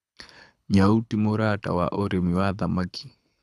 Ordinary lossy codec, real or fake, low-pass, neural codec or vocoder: none; fake; none; codec, 24 kHz, 6 kbps, HILCodec